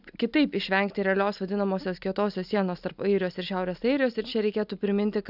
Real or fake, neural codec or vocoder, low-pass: real; none; 5.4 kHz